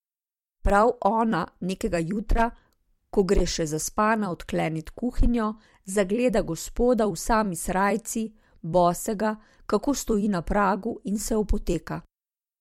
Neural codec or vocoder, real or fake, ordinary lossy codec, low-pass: vocoder, 44.1 kHz, 128 mel bands every 256 samples, BigVGAN v2; fake; MP3, 64 kbps; 19.8 kHz